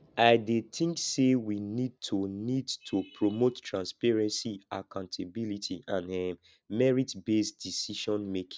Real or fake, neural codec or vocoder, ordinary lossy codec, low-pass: real; none; none; none